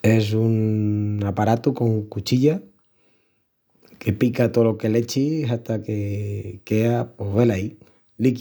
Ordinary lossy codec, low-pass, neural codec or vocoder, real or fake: none; none; none; real